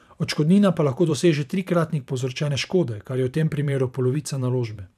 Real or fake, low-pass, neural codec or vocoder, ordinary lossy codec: fake; 14.4 kHz; vocoder, 44.1 kHz, 128 mel bands every 512 samples, BigVGAN v2; none